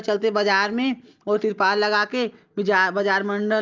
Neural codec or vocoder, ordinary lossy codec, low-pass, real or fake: none; Opus, 24 kbps; 7.2 kHz; real